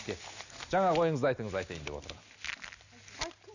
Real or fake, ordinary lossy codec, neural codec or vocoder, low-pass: real; none; none; 7.2 kHz